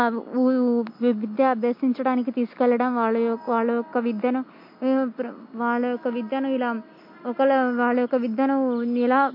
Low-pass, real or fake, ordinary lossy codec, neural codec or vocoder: 5.4 kHz; real; MP3, 32 kbps; none